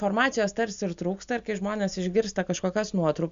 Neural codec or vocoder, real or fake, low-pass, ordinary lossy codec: none; real; 7.2 kHz; Opus, 64 kbps